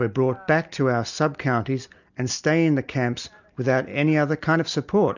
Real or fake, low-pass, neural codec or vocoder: real; 7.2 kHz; none